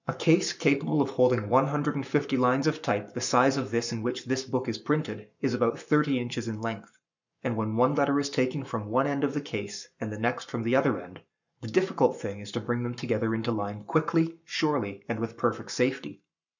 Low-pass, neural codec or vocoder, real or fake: 7.2 kHz; codec, 44.1 kHz, 7.8 kbps, Pupu-Codec; fake